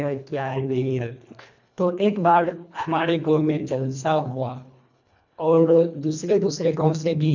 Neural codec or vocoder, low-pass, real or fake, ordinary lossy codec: codec, 24 kHz, 1.5 kbps, HILCodec; 7.2 kHz; fake; none